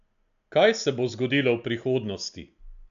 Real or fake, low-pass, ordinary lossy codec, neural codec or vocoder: real; 7.2 kHz; none; none